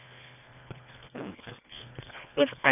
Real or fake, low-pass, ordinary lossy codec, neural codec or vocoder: fake; 3.6 kHz; none; codec, 24 kHz, 1.5 kbps, HILCodec